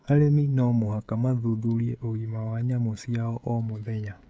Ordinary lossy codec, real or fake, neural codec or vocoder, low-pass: none; fake; codec, 16 kHz, 16 kbps, FreqCodec, smaller model; none